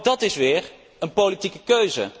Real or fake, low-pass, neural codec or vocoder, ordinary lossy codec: real; none; none; none